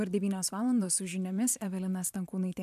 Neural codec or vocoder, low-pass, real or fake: vocoder, 44.1 kHz, 128 mel bands every 512 samples, BigVGAN v2; 14.4 kHz; fake